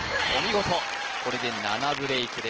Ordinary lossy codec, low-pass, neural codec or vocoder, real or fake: Opus, 16 kbps; 7.2 kHz; none; real